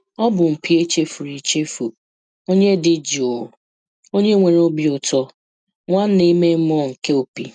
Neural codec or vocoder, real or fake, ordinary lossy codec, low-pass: none; real; none; 9.9 kHz